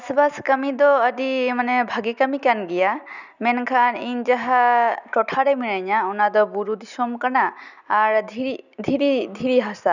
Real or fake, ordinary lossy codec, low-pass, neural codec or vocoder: real; none; 7.2 kHz; none